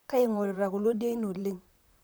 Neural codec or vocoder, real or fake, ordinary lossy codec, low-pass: vocoder, 44.1 kHz, 128 mel bands, Pupu-Vocoder; fake; none; none